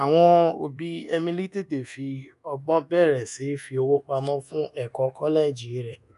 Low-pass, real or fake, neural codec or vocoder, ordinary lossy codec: 10.8 kHz; fake; codec, 24 kHz, 1.2 kbps, DualCodec; none